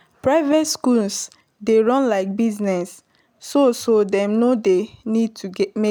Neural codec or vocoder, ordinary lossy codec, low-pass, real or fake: none; none; none; real